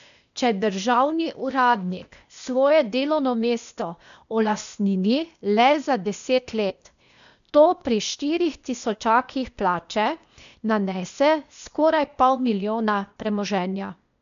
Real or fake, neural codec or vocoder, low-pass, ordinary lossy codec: fake; codec, 16 kHz, 0.8 kbps, ZipCodec; 7.2 kHz; none